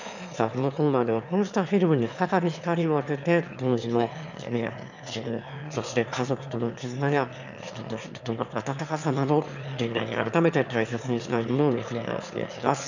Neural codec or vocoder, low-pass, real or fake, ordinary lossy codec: autoencoder, 22.05 kHz, a latent of 192 numbers a frame, VITS, trained on one speaker; 7.2 kHz; fake; none